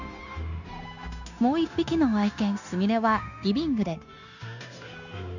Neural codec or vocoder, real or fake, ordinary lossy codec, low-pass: codec, 16 kHz, 0.9 kbps, LongCat-Audio-Codec; fake; none; 7.2 kHz